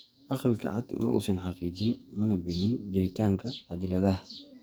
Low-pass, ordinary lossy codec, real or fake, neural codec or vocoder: none; none; fake; codec, 44.1 kHz, 2.6 kbps, SNAC